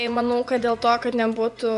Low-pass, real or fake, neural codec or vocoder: 10.8 kHz; fake; vocoder, 24 kHz, 100 mel bands, Vocos